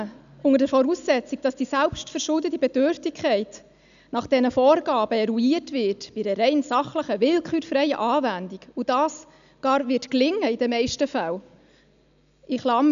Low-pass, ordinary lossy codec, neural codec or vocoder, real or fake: 7.2 kHz; none; none; real